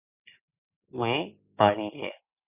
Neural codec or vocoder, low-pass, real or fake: none; 3.6 kHz; real